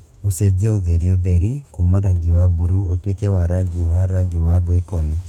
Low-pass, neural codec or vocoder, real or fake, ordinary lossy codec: 19.8 kHz; codec, 44.1 kHz, 2.6 kbps, DAC; fake; none